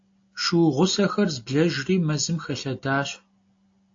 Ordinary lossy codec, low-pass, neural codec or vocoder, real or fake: AAC, 48 kbps; 7.2 kHz; none; real